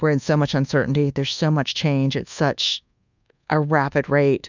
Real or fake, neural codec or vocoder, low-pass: fake; codec, 24 kHz, 1.2 kbps, DualCodec; 7.2 kHz